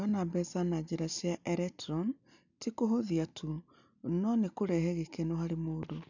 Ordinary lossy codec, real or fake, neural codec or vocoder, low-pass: none; real; none; 7.2 kHz